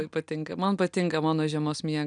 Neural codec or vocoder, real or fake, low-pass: none; real; 9.9 kHz